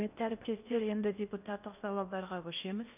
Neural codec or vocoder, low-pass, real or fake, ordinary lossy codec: codec, 16 kHz in and 24 kHz out, 0.8 kbps, FocalCodec, streaming, 65536 codes; 3.6 kHz; fake; none